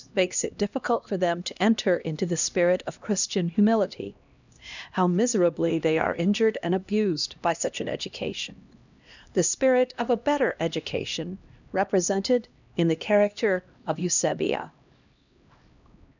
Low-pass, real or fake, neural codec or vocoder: 7.2 kHz; fake; codec, 16 kHz, 1 kbps, X-Codec, HuBERT features, trained on LibriSpeech